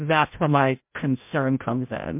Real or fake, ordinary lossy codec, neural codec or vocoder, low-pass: fake; MP3, 32 kbps; codec, 16 kHz, 0.5 kbps, FreqCodec, larger model; 3.6 kHz